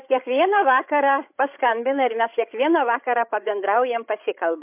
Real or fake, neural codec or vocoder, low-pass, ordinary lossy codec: fake; codec, 16 kHz, 16 kbps, FreqCodec, larger model; 3.6 kHz; MP3, 32 kbps